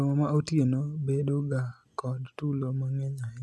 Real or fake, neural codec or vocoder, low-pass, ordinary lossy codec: real; none; none; none